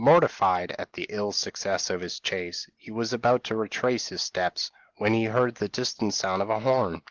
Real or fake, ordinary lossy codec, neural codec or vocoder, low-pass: real; Opus, 16 kbps; none; 7.2 kHz